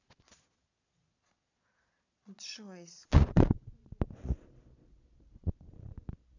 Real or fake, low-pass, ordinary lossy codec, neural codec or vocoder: fake; 7.2 kHz; none; vocoder, 22.05 kHz, 80 mel bands, Vocos